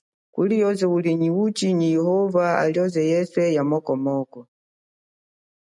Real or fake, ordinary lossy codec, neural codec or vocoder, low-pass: real; MP3, 96 kbps; none; 10.8 kHz